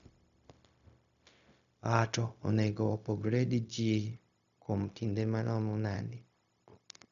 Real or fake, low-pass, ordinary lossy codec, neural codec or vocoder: fake; 7.2 kHz; none; codec, 16 kHz, 0.4 kbps, LongCat-Audio-Codec